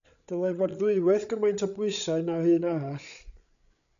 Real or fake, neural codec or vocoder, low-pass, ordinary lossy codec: fake; codec, 16 kHz, 8 kbps, FreqCodec, larger model; 7.2 kHz; MP3, 96 kbps